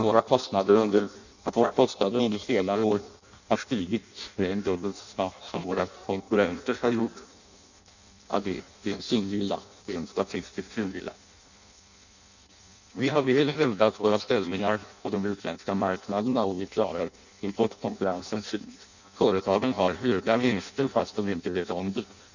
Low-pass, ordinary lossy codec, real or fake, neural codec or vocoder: 7.2 kHz; none; fake; codec, 16 kHz in and 24 kHz out, 0.6 kbps, FireRedTTS-2 codec